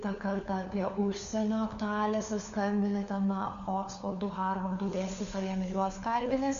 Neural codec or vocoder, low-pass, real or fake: codec, 16 kHz, 4 kbps, FunCodec, trained on LibriTTS, 50 frames a second; 7.2 kHz; fake